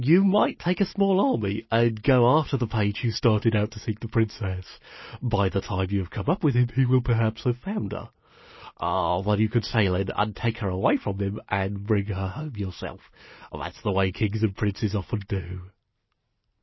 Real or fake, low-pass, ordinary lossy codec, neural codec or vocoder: real; 7.2 kHz; MP3, 24 kbps; none